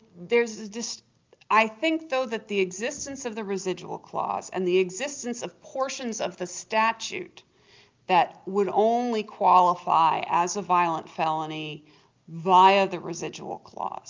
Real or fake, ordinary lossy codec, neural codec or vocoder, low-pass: real; Opus, 24 kbps; none; 7.2 kHz